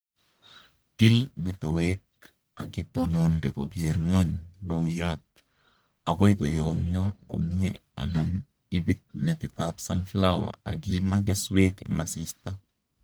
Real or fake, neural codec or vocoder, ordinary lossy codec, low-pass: fake; codec, 44.1 kHz, 1.7 kbps, Pupu-Codec; none; none